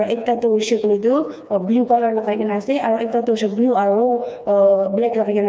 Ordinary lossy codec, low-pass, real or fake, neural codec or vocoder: none; none; fake; codec, 16 kHz, 2 kbps, FreqCodec, smaller model